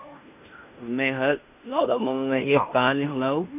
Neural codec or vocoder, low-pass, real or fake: codec, 16 kHz in and 24 kHz out, 0.9 kbps, LongCat-Audio-Codec, fine tuned four codebook decoder; 3.6 kHz; fake